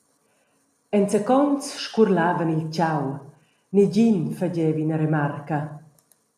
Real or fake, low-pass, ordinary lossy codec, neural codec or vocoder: real; 14.4 kHz; MP3, 96 kbps; none